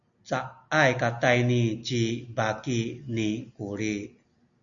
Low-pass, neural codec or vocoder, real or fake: 7.2 kHz; none; real